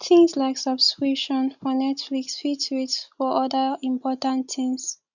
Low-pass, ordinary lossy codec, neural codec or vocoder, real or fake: 7.2 kHz; none; none; real